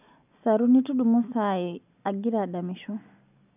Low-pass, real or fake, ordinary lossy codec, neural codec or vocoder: 3.6 kHz; real; none; none